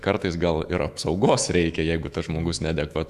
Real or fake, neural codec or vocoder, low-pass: fake; autoencoder, 48 kHz, 128 numbers a frame, DAC-VAE, trained on Japanese speech; 14.4 kHz